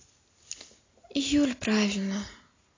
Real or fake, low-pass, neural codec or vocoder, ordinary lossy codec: real; 7.2 kHz; none; AAC, 32 kbps